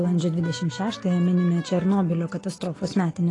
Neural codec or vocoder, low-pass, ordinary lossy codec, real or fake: none; 10.8 kHz; AAC, 32 kbps; real